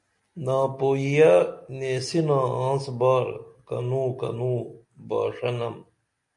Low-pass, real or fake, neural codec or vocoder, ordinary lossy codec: 10.8 kHz; real; none; MP3, 96 kbps